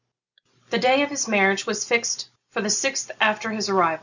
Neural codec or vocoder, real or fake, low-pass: none; real; 7.2 kHz